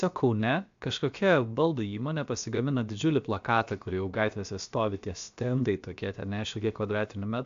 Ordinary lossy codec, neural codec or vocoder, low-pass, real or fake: AAC, 64 kbps; codec, 16 kHz, about 1 kbps, DyCAST, with the encoder's durations; 7.2 kHz; fake